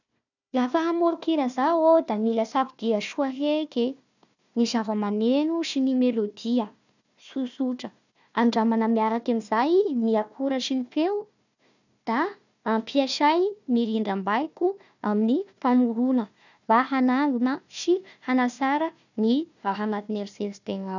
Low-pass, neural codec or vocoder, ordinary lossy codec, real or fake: 7.2 kHz; codec, 16 kHz, 1 kbps, FunCodec, trained on Chinese and English, 50 frames a second; none; fake